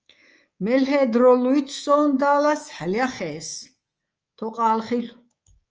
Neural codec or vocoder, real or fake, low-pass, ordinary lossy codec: codec, 24 kHz, 3.1 kbps, DualCodec; fake; 7.2 kHz; Opus, 24 kbps